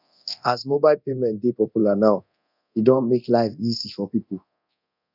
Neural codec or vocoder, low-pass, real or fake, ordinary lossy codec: codec, 24 kHz, 0.9 kbps, DualCodec; 5.4 kHz; fake; none